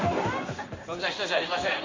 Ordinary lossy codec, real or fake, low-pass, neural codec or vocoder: MP3, 48 kbps; fake; 7.2 kHz; codec, 16 kHz in and 24 kHz out, 1 kbps, XY-Tokenizer